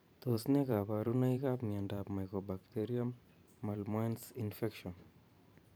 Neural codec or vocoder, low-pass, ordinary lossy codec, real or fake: vocoder, 44.1 kHz, 128 mel bands every 512 samples, BigVGAN v2; none; none; fake